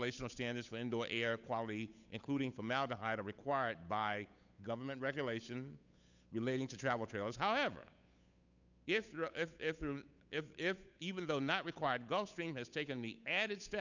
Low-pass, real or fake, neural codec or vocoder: 7.2 kHz; fake; codec, 16 kHz, 8 kbps, FunCodec, trained on Chinese and English, 25 frames a second